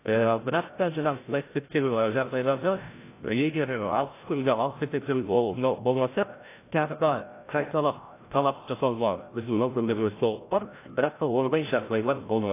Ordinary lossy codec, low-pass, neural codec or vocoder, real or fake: AAC, 24 kbps; 3.6 kHz; codec, 16 kHz, 0.5 kbps, FreqCodec, larger model; fake